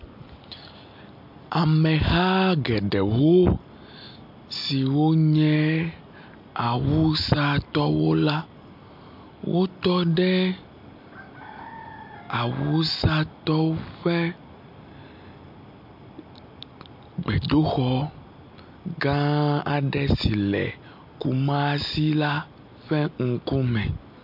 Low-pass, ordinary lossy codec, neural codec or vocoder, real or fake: 5.4 kHz; MP3, 48 kbps; none; real